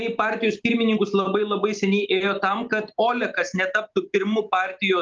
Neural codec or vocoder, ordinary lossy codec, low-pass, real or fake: none; Opus, 24 kbps; 7.2 kHz; real